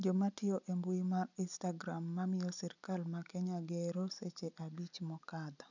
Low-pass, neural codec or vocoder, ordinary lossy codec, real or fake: 7.2 kHz; none; none; real